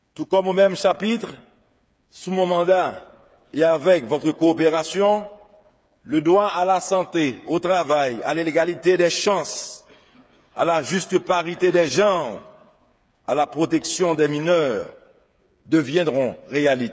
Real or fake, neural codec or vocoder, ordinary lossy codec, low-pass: fake; codec, 16 kHz, 8 kbps, FreqCodec, smaller model; none; none